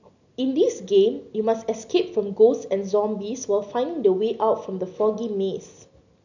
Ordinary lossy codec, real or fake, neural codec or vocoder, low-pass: none; real; none; 7.2 kHz